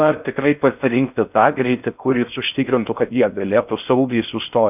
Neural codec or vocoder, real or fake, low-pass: codec, 16 kHz in and 24 kHz out, 0.6 kbps, FocalCodec, streaming, 4096 codes; fake; 3.6 kHz